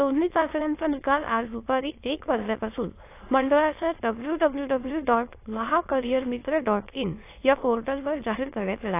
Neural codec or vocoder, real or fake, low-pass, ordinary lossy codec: autoencoder, 22.05 kHz, a latent of 192 numbers a frame, VITS, trained on many speakers; fake; 3.6 kHz; AAC, 24 kbps